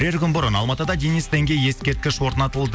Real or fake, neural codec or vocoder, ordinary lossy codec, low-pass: real; none; none; none